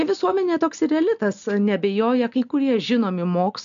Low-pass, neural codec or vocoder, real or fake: 7.2 kHz; none; real